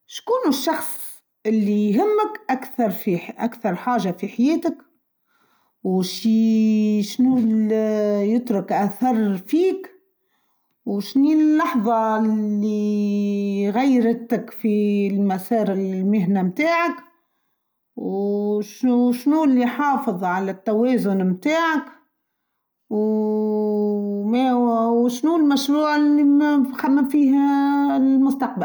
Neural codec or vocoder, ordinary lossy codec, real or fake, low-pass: none; none; real; none